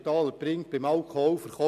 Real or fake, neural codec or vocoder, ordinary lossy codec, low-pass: real; none; none; 14.4 kHz